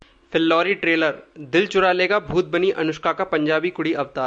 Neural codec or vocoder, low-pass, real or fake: none; 9.9 kHz; real